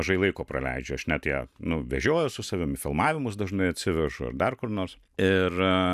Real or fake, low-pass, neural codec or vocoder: real; 14.4 kHz; none